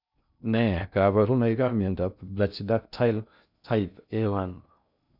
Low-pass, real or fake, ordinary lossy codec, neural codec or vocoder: 5.4 kHz; fake; none; codec, 16 kHz in and 24 kHz out, 0.6 kbps, FocalCodec, streaming, 2048 codes